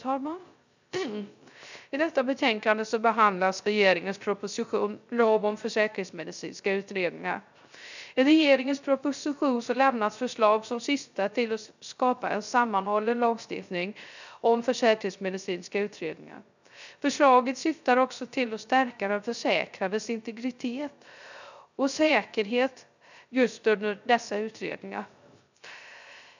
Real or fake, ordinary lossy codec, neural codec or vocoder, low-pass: fake; none; codec, 16 kHz, 0.3 kbps, FocalCodec; 7.2 kHz